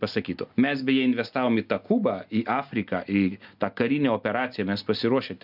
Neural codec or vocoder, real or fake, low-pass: none; real; 5.4 kHz